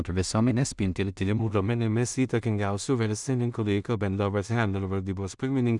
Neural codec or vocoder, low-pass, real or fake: codec, 16 kHz in and 24 kHz out, 0.4 kbps, LongCat-Audio-Codec, two codebook decoder; 10.8 kHz; fake